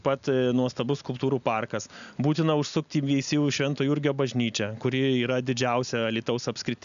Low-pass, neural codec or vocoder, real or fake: 7.2 kHz; none; real